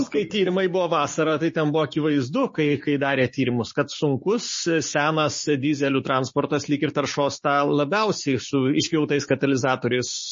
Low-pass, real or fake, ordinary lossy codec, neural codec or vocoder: 7.2 kHz; fake; MP3, 32 kbps; codec, 16 kHz, 6 kbps, DAC